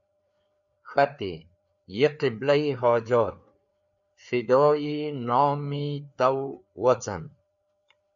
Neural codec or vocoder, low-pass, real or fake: codec, 16 kHz, 4 kbps, FreqCodec, larger model; 7.2 kHz; fake